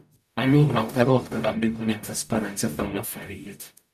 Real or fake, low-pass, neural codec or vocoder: fake; 14.4 kHz; codec, 44.1 kHz, 0.9 kbps, DAC